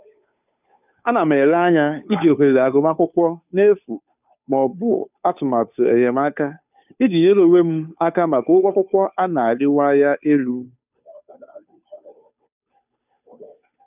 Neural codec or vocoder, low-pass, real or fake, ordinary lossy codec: codec, 16 kHz, 2 kbps, FunCodec, trained on Chinese and English, 25 frames a second; 3.6 kHz; fake; none